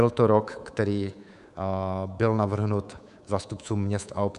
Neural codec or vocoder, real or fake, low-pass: codec, 24 kHz, 3.1 kbps, DualCodec; fake; 10.8 kHz